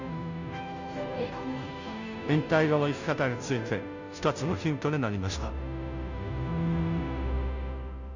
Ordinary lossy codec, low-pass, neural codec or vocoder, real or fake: none; 7.2 kHz; codec, 16 kHz, 0.5 kbps, FunCodec, trained on Chinese and English, 25 frames a second; fake